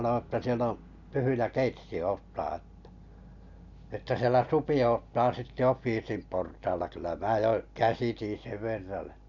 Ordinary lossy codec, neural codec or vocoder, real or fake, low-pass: none; none; real; 7.2 kHz